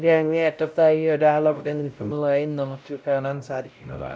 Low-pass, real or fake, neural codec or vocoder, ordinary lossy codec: none; fake; codec, 16 kHz, 0.5 kbps, X-Codec, WavLM features, trained on Multilingual LibriSpeech; none